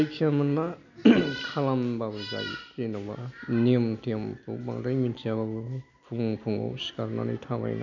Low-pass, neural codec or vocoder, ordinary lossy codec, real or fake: 7.2 kHz; none; none; real